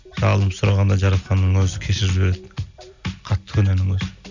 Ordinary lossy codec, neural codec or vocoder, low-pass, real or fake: none; none; 7.2 kHz; real